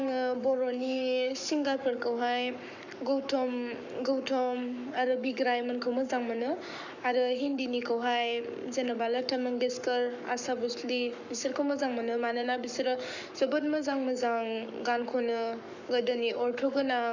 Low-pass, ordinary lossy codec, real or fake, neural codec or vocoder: 7.2 kHz; none; fake; codec, 44.1 kHz, 7.8 kbps, Pupu-Codec